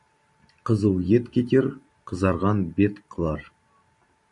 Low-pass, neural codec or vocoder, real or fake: 10.8 kHz; vocoder, 44.1 kHz, 128 mel bands every 512 samples, BigVGAN v2; fake